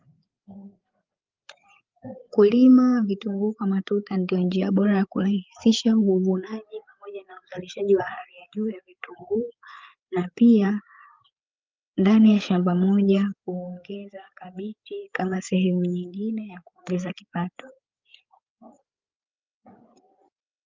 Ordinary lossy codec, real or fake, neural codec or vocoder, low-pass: Opus, 24 kbps; fake; codec, 16 kHz, 8 kbps, FreqCodec, larger model; 7.2 kHz